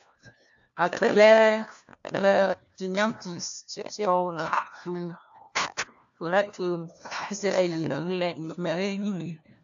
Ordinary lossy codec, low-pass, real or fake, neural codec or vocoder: AAC, 64 kbps; 7.2 kHz; fake; codec, 16 kHz, 1 kbps, FunCodec, trained on LibriTTS, 50 frames a second